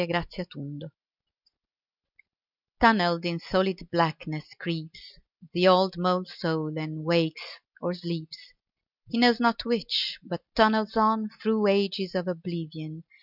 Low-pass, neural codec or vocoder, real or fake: 5.4 kHz; none; real